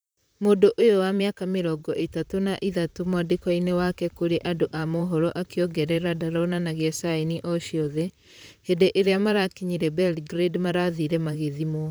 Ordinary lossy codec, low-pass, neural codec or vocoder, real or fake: none; none; vocoder, 44.1 kHz, 128 mel bands, Pupu-Vocoder; fake